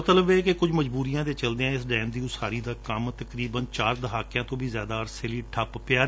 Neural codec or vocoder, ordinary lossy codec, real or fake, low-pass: none; none; real; none